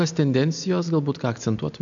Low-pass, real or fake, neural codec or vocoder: 7.2 kHz; real; none